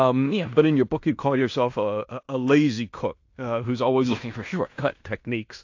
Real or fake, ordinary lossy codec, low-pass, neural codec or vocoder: fake; AAC, 48 kbps; 7.2 kHz; codec, 16 kHz in and 24 kHz out, 0.9 kbps, LongCat-Audio-Codec, fine tuned four codebook decoder